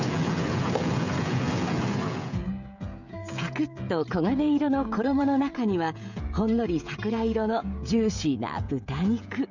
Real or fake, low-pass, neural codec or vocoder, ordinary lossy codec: fake; 7.2 kHz; codec, 16 kHz, 16 kbps, FreqCodec, smaller model; none